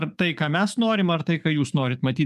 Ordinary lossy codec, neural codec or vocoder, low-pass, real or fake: MP3, 96 kbps; codec, 44.1 kHz, 7.8 kbps, DAC; 14.4 kHz; fake